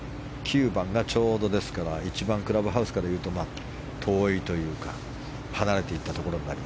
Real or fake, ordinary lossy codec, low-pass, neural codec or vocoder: real; none; none; none